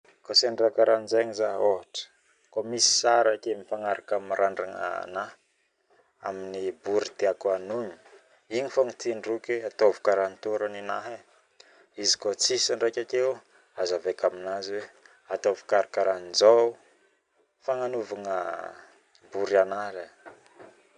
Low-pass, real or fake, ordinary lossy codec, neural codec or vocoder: 9.9 kHz; real; none; none